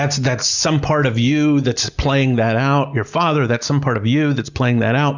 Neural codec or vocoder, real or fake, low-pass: none; real; 7.2 kHz